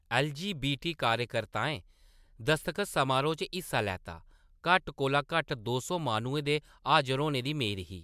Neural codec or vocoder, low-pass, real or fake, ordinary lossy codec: none; 14.4 kHz; real; MP3, 96 kbps